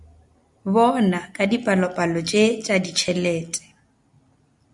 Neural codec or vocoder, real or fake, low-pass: none; real; 10.8 kHz